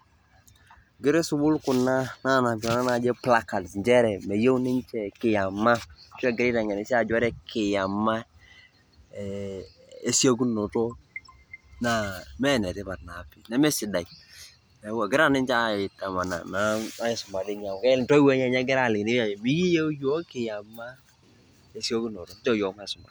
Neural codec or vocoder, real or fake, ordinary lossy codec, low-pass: none; real; none; none